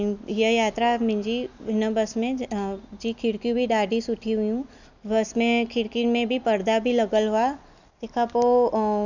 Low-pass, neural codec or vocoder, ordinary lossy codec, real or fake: 7.2 kHz; none; none; real